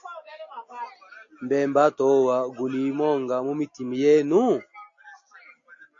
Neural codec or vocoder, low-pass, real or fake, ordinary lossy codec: none; 7.2 kHz; real; AAC, 48 kbps